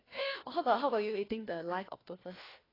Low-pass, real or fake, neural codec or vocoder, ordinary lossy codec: 5.4 kHz; fake; codec, 16 kHz, 1 kbps, FunCodec, trained on LibriTTS, 50 frames a second; AAC, 24 kbps